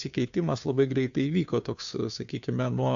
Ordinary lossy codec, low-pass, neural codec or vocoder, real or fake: AAC, 64 kbps; 7.2 kHz; codec, 16 kHz, 2 kbps, FunCodec, trained on Chinese and English, 25 frames a second; fake